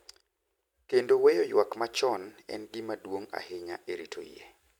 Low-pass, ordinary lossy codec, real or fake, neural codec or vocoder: 19.8 kHz; none; real; none